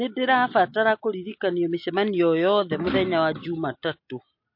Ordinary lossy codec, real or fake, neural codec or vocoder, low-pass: MP3, 32 kbps; real; none; 5.4 kHz